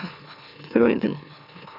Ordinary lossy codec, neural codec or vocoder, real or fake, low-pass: none; autoencoder, 44.1 kHz, a latent of 192 numbers a frame, MeloTTS; fake; 5.4 kHz